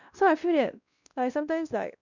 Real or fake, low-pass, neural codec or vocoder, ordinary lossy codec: fake; 7.2 kHz; codec, 16 kHz, 1 kbps, X-Codec, WavLM features, trained on Multilingual LibriSpeech; none